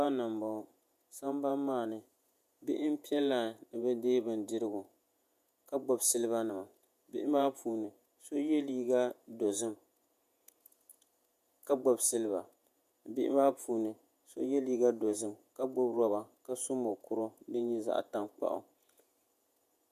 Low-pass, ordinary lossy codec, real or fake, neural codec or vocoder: 14.4 kHz; MP3, 96 kbps; fake; vocoder, 48 kHz, 128 mel bands, Vocos